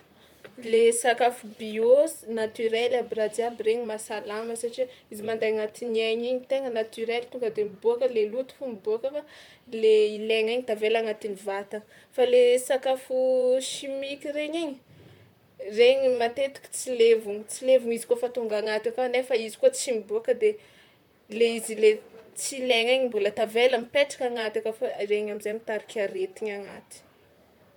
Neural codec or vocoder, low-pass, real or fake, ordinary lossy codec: vocoder, 44.1 kHz, 128 mel bands, Pupu-Vocoder; 19.8 kHz; fake; MP3, 96 kbps